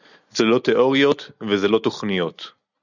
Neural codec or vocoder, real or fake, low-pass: none; real; 7.2 kHz